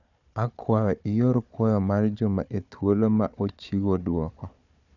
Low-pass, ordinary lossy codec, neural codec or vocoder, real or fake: 7.2 kHz; none; codec, 16 kHz, 4 kbps, FunCodec, trained on Chinese and English, 50 frames a second; fake